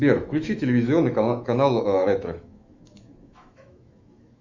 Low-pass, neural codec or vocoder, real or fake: 7.2 kHz; autoencoder, 48 kHz, 128 numbers a frame, DAC-VAE, trained on Japanese speech; fake